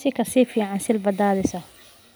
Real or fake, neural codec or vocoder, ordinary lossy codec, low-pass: real; none; none; none